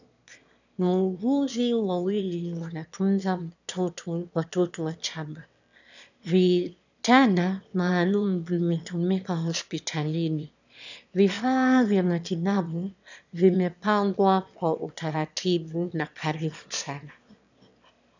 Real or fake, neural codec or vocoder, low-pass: fake; autoencoder, 22.05 kHz, a latent of 192 numbers a frame, VITS, trained on one speaker; 7.2 kHz